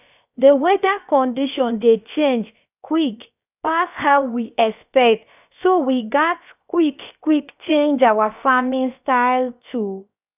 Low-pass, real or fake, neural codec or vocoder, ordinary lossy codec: 3.6 kHz; fake; codec, 16 kHz, about 1 kbps, DyCAST, with the encoder's durations; none